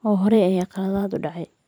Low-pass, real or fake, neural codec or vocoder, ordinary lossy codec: 19.8 kHz; fake; vocoder, 44.1 kHz, 128 mel bands every 512 samples, BigVGAN v2; none